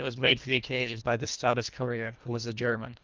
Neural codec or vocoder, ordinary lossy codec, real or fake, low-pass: codec, 24 kHz, 1.5 kbps, HILCodec; Opus, 24 kbps; fake; 7.2 kHz